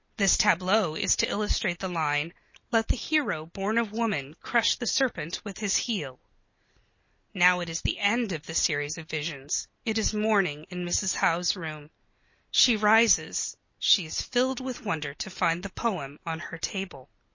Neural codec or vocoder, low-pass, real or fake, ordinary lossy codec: none; 7.2 kHz; real; MP3, 32 kbps